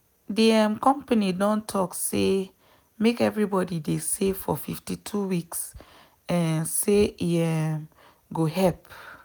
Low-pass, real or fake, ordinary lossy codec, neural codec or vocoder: none; real; none; none